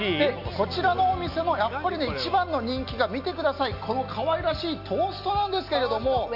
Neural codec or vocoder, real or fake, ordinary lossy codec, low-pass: none; real; none; 5.4 kHz